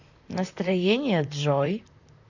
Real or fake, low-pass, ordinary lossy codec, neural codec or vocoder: fake; 7.2 kHz; none; vocoder, 44.1 kHz, 128 mel bands, Pupu-Vocoder